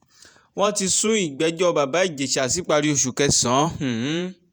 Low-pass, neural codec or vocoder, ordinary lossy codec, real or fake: none; vocoder, 48 kHz, 128 mel bands, Vocos; none; fake